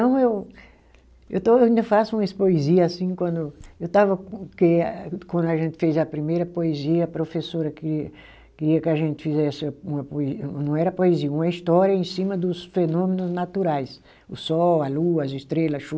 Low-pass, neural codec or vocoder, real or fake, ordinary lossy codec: none; none; real; none